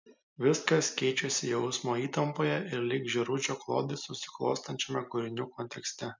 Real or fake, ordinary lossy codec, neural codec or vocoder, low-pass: real; MP3, 64 kbps; none; 7.2 kHz